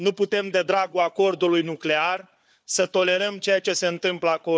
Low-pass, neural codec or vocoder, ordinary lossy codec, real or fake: none; codec, 16 kHz, 4 kbps, FunCodec, trained on Chinese and English, 50 frames a second; none; fake